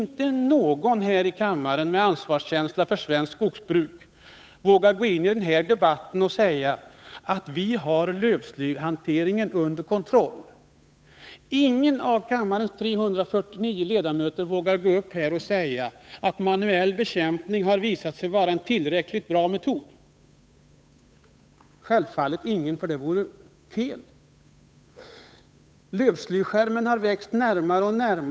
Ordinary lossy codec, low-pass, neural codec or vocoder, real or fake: none; none; codec, 16 kHz, 8 kbps, FunCodec, trained on Chinese and English, 25 frames a second; fake